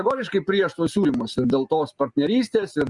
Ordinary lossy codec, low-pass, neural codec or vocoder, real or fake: Opus, 64 kbps; 10.8 kHz; none; real